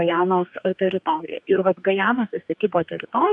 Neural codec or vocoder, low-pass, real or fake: codec, 44.1 kHz, 2.6 kbps, DAC; 10.8 kHz; fake